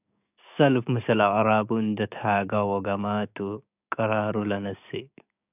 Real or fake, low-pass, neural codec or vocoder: fake; 3.6 kHz; codec, 16 kHz, 6 kbps, DAC